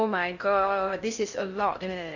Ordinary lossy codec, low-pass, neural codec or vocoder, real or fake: none; 7.2 kHz; codec, 16 kHz in and 24 kHz out, 0.6 kbps, FocalCodec, streaming, 2048 codes; fake